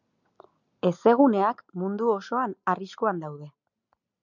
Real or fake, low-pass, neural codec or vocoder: real; 7.2 kHz; none